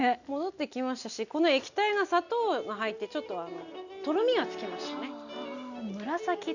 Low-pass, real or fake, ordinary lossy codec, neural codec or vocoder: 7.2 kHz; real; none; none